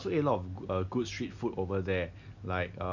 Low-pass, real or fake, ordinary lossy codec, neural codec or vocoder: 7.2 kHz; real; none; none